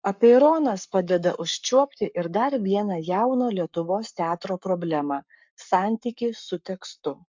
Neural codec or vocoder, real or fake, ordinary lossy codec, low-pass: codec, 44.1 kHz, 7.8 kbps, Pupu-Codec; fake; MP3, 64 kbps; 7.2 kHz